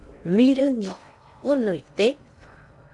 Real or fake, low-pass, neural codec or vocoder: fake; 10.8 kHz; codec, 16 kHz in and 24 kHz out, 0.6 kbps, FocalCodec, streaming, 4096 codes